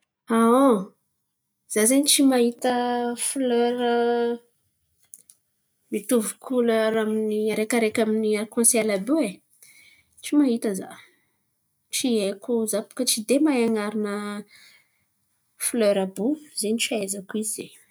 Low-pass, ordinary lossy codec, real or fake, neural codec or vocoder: none; none; real; none